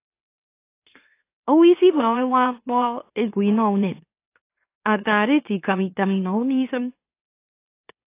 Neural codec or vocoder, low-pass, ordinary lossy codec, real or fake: autoencoder, 44.1 kHz, a latent of 192 numbers a frame, MeloTTS; 3.6 kHz; AAC, 24 kbps; fake